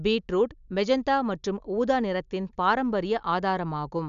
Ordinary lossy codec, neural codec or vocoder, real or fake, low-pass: none; none; real; 7.2 kHz